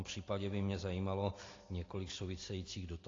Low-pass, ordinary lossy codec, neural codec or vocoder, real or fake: 7.2 kHz; AAC, 32 kbps; none; real